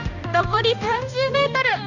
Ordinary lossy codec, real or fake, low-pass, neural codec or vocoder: none; fake; 7.2 kHz; codec, 16 kHz, 1 kbps, X-Codec, HuBERT features, trained on balanced general audio